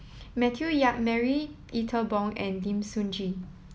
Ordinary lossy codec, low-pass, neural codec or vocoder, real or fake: none; none; none; real